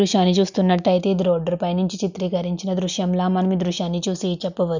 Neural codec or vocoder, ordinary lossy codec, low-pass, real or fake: none; none; 7.2 kHz; real